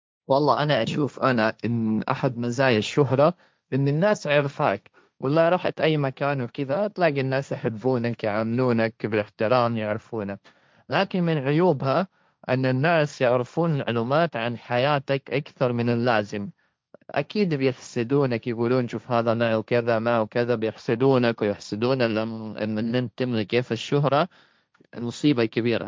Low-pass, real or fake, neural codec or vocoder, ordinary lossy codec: none; fake; codec, 16 kHz, 1.1 kbps, Voila-Tokenizer; none